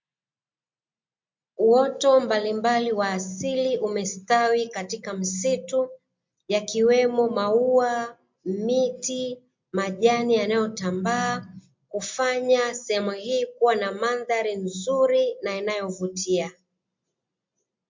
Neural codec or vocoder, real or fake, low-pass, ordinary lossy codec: none; real; 7.2 kHz; MP3, 48 kbps